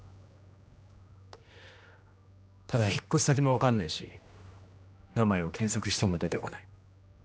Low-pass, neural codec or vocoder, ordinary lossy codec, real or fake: none; codec, 16 kHz, 1 kbps, X-Codec, HuBERT features, trained on general audio; none; fake